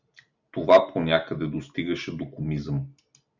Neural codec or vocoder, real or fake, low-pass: none; real; 7.2 kHz